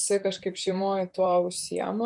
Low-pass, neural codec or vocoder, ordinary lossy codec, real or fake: 10.8 kHz; vocoder, 44.1 kHz, 128 mel bands, Pupu-Vocoder; MP3, 64 kbps; fake